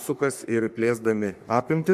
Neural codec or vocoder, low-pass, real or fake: codec, 44.1 kHz, 3.4 kbps, Pupu-Codec; 14.4 kHz; fake